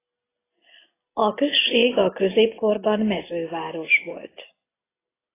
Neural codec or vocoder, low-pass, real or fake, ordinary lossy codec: none; 3.6 kHz; real; AAC, 16 kbps